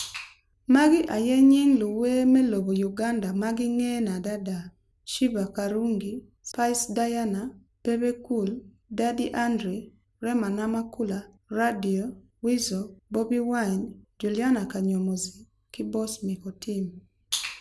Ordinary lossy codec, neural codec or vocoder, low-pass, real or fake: none; none; none; real